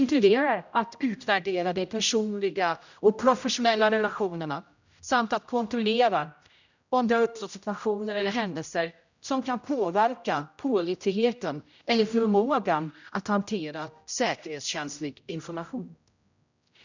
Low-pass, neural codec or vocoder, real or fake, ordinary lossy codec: 7.2 kHz; codec, 16 kHz, 0.5 kbps, X-Codec, HuBERT features, trained on general audio; fake; none